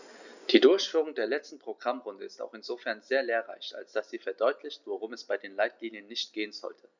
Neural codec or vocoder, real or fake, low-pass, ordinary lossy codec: none; real; 7.2 kHz; none